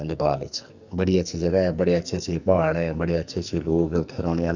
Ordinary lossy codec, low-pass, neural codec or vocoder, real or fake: none; 7.2 kHz; codec, 44.1 kHz, 2.6 kbps, SNAC; fake